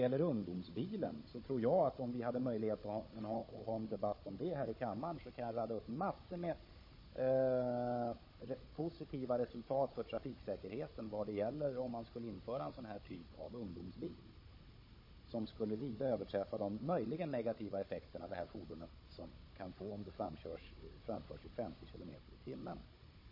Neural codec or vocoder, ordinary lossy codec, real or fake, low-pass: codec, 16 kHz, 16 kbps, FunCodec, trained on Chinese and English, 50 frames a second; MP3, 24 kbps; fake; 5.4 kHz